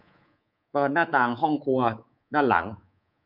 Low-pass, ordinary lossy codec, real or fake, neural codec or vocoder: 5.4 kHz; none; fake; codec, 24 kHz, 3.1 kbps, DualCodec